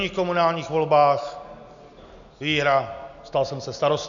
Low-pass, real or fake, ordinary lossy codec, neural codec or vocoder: 7.2 kHz; real; MP3, 96 kbps; none